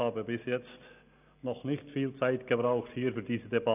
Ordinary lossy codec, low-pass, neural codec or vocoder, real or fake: none; 3.6 kHz; none; real